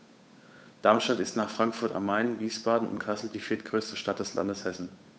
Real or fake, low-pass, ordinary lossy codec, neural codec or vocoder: fake; none; none; codec, 16 kHz, 8 kbps, FunCodec, trained on Chinese and English, 25 frames a second